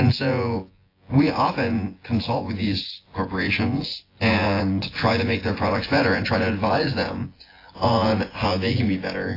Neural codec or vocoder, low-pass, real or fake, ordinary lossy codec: vocoder, 24 kHz, 100 mel bands, Vocos; 5.4 kHz; fake; AAC, 24 kbps